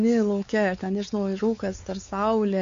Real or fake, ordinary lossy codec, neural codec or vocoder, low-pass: fake; AAC, 48 kbps; codec, 16 kHz, 4 kbps, X-Codec, WavLM features, trained on Multilingual LibriSpeech; 7.2 kHz